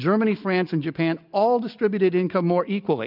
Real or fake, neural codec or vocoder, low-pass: real; none; 5.4 kHz